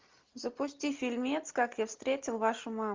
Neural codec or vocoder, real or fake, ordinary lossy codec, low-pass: none; real; Opus, 32 kbps; 7.2 kHz